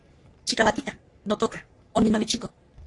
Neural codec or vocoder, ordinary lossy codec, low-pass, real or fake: codec, 44.1 kHz, 3.4 kbps, Pupu-Codec; AAC, 48 kbps; 10.8 kHz; fake